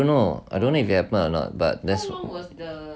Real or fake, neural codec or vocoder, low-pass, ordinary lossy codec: real; none; none; none